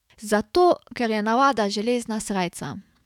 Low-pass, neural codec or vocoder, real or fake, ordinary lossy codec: 19.8 kHz; autoencoder, 48 kHz, 128 numbers a frame, DAC-VAE, trained on Japanese speech; fake; none